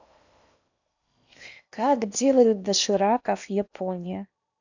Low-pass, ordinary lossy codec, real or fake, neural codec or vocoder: 7.2 kHz; none; fake; codec, 16 kHz in and 24 kHz out, 0.8 kbps, FocalCodec, streaming, 65536 codes